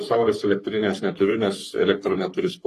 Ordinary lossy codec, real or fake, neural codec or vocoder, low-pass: AAC, 48 kbps; fake; codec, 44.1 kHz, 3.4 kbps, Pupu-Codec; 14.4 kHz